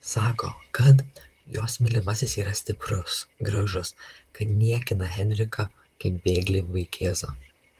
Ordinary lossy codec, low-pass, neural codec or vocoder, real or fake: Opus, 24 kbps; 14.4 kHz; vocoder, 44.1 kHz, 128 mel bands, Pupu-Vocoder; fake